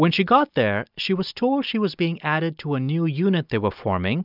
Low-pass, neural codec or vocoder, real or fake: 5.4 kHz; none; real